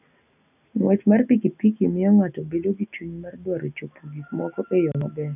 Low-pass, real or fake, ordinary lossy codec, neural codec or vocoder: 3.6 kHz; real; none; none